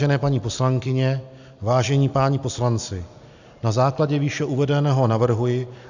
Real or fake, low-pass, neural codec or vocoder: real; 7.2 kHz; none